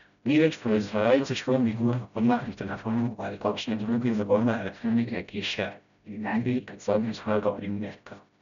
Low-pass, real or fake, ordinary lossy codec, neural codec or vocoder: 7.2 kHz; fake; none; codec, 16 kHz, 0.5 kbps, FreqCodec, smaller model